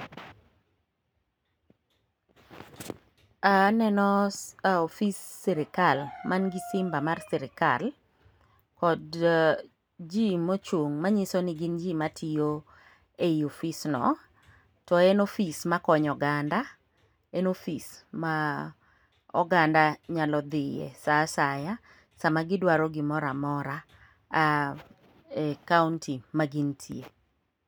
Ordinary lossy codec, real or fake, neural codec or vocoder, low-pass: none; real; none; none